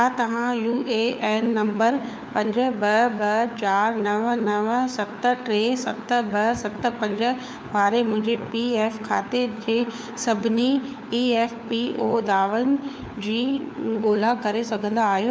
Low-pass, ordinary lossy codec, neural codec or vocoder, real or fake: none; none; codec, 16 kHz, 4 kbps, FunCodec, trained on LibriTTS, 50 frames a second; fake